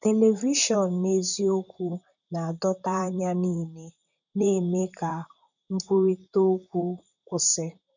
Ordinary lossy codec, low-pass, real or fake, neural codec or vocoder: none; 7.2 kHz; fake; vocoder, 44.1 kHz, 128 mel bands, Pupu-Vocoder